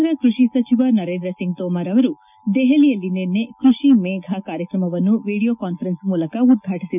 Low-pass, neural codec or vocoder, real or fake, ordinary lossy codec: 3.6 kHz; none; real; none